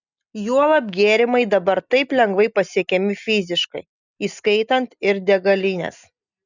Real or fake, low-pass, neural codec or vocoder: real; 7.2 kHz; none